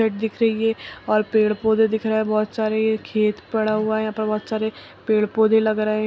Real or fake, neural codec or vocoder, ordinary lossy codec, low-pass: real; none; none; none